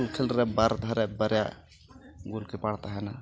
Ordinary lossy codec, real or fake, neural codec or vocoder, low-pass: none; real; none; none